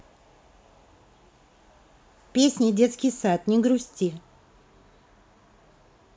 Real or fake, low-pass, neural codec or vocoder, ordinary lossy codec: real; none; none; none